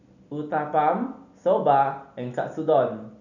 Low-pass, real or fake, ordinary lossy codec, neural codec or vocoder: 7.2 kHz; real; none; none